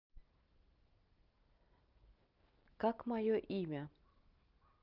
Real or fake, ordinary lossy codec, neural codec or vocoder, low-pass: real; Opus, 32 kbps; none; 5.4 kHz